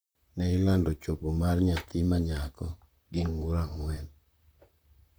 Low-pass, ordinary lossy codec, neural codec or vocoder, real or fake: none; none; vocoder, 44.1 kHz, 128 mel bands, Pupu-Vocoder; fake